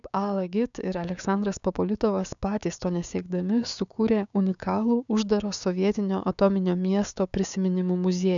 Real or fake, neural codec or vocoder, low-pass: fake; codec, 16 kHz, 6 kbps, DAC; 7.2 kHz